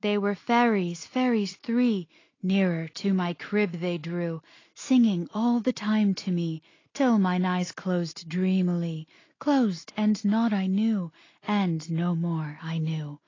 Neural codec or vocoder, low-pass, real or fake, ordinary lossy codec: none; 7.2 kHz; real; AAC, 32 kbps